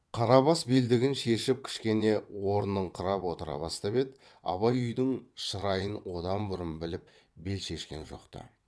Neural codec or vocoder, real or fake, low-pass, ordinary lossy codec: vocoder, 22.05 kHz, 80 mel bands, WaveNeXt; fake; none; none